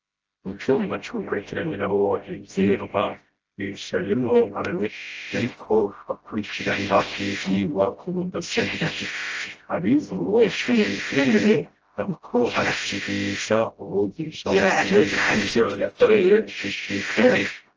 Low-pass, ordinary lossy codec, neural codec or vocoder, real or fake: 7.2 kHz; Opus, 24 kbps; codec, 16 kHz, 0.5 kbps, FreqCodec, smaller model; fake